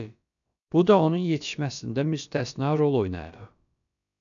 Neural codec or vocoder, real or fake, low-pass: codec, 16 kHz, about 1 kbps, DyCAST, with the encoder's durations; fake; 7.2 kHz